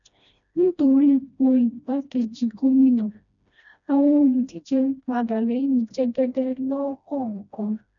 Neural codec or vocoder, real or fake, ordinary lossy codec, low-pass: codec, 16 kHz, 1 kbps, FreqCodec, smaller model; fake; none; 7.2 kHz